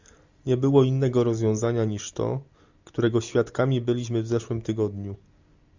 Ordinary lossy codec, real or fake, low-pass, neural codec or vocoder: Opus, 64 kbps; real; 7.2 kHz; none